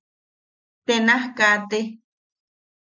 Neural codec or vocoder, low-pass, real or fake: none; 7.2 kHz; real